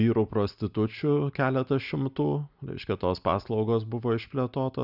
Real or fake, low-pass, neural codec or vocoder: real; 5.4 kHz; none